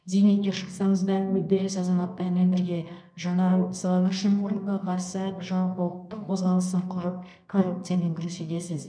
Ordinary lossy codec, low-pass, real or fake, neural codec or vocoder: none; 9.9 kHz; fake; codec, 24 kHz, 0.9 kbps, WavTokenizer, medium music audio release